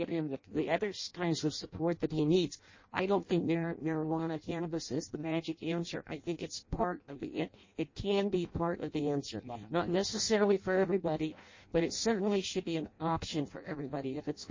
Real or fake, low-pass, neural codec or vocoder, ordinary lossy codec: fake; 7.2 kHz; codec, 16 kHz in and 24 kHz out, 0.6 kbps, FireRedTTS-2 codec; MP3, 32 kbps